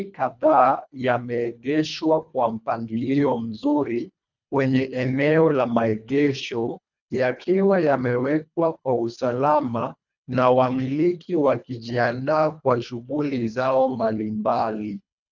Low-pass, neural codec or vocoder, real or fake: 7.2 kHz; codec, 24 kHz, 1.5 kbps, HILCodec; fake